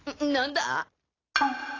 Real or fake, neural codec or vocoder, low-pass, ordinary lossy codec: real; none; 7.2 kHz; AAC, 32 kbps